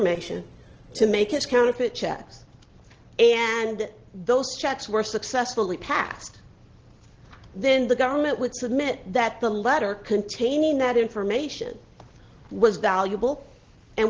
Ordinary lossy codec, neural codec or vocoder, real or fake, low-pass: Opus, 16 kbps; none; real; 7.2 kHz